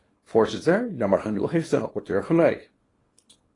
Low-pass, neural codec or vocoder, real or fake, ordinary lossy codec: 10.8 kHz; codec, 24 kHz, 0.9 kbps, WavTokenizer, small release; fake; AAC, 32 kbps